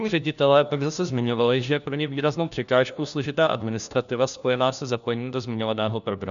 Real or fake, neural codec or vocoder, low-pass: fake; codec, 16 kHz, 1 kbps, FunCodec, trained on LibriTTS, 50 frames a second; 7.2 kHz